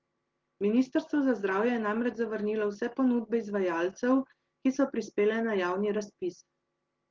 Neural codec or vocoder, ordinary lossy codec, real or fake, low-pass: none; Opus, 16 kbps; real; 7.2 kHz